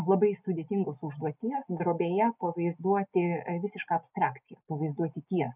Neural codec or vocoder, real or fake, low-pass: none; real; 3.6 kHz